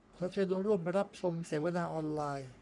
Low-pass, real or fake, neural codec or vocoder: 10.8 kHz; fake; codec, 44.1 kHz, 3.4 kbps, Pupu-Codec